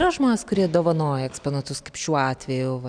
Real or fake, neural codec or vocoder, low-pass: real; none; 9.9 kHz